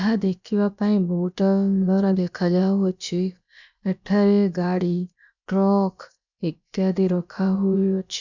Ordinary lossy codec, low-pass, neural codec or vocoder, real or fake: none; 7.2 kHz; codec, 16 kHz, about 1 kbps, DyCAST, with the encoder's durations; fake